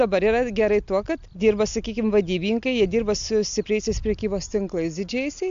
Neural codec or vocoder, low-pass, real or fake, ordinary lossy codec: none; 7.2 kHz; real; AAC, 64 kbps